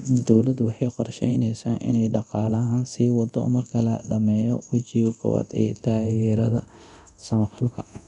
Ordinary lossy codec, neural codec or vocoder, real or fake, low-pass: none; codec, 24 kHz, 0.9 kbps, DualCodec; fake; 10.8 kHz